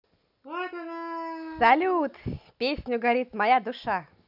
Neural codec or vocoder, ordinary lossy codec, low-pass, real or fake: none; none; 5.4 kHz; real